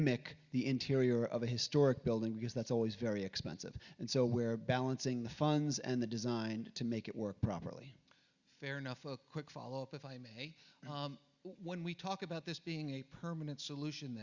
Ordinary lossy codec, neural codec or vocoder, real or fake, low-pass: Opus, 64 kbps; none; real; 7.2 kHz